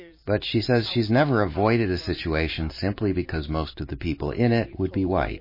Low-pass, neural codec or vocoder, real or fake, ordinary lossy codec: 5.4 kHz; vocoder, 44.1 kHz, 128 mel bands every 512 samples, BigVGAN v2; fake; MP3, 24 kbps